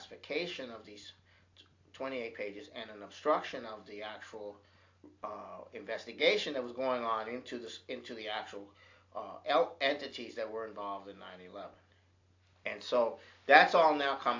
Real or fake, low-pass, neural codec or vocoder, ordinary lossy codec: real; 7.2 kHz; none; AAC, 48 kbps